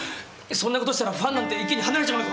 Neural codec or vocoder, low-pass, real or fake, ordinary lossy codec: none; none; real; none